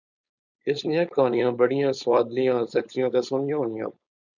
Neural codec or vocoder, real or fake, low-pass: codec, 16 kHz, 4.8 kbps, FACodec; fake; 7.2 kHz